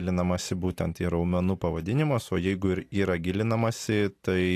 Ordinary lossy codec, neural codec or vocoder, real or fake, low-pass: AAC, 64 kbps; none; real; 14.4 kHz